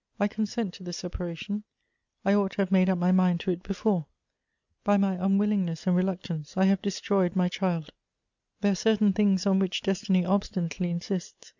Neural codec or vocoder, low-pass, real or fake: none; 7.2 kHz; real